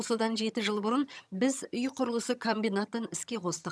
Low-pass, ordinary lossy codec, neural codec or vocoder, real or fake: none; none; vocoder, 22.05 kHz, 80 mel bands, HiFi-GAN; fake